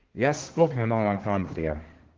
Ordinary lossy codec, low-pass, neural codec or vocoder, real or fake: Opus, 16 kbps; 7.2 kHz; codec, 24 kHz, 1 kbps, SNAC; fake